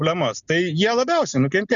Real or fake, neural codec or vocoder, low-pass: real; none; 7.2 kHz